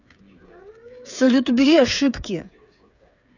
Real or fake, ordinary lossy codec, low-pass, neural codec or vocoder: fake; none; 7.2 kHz; codec, 16 kHz, 8 kbps, FreqCodec, smaller model